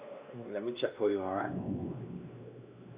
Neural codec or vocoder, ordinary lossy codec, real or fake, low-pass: codec, 16 kHz, 2 kbps, X-Codec, WavLM features, trained on Multilingual LibriSpeech; Opus, 64 kbps; fake; 3.6 kHz